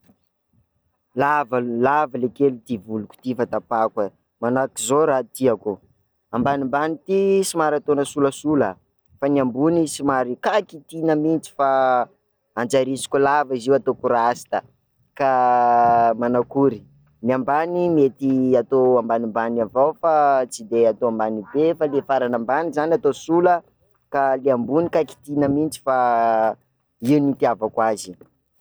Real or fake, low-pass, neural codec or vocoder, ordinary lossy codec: real; none; none; none